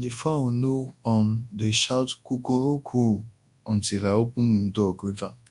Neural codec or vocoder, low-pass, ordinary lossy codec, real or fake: codec, 24 kHz, 0.9 kbps, WavTokenizer, large speech release; 10.8 kHz; MP3, 64 kbps; fake